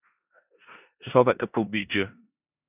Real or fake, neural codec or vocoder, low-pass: fake; codec, 16 kHz in and 24 kHz out, 0.9 kbps, LongCat-Audio-Codec, four codebook decoder; 3.6 kHz